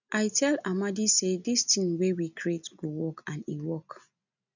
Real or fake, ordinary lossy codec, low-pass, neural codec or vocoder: real; none; 7.2 kHz; none